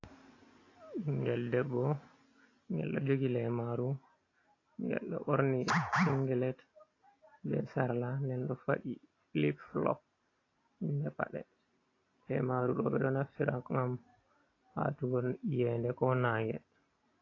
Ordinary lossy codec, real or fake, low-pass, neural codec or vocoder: AAC, 32 kbps; real; 7.2 kHz; none